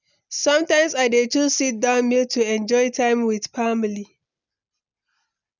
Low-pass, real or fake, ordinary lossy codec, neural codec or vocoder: 7.2 kHz; real; none; none